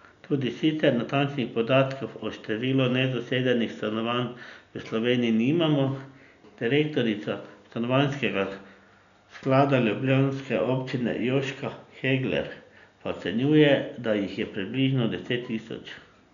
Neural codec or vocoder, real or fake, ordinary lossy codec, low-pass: none; real; none; 7.2 kHz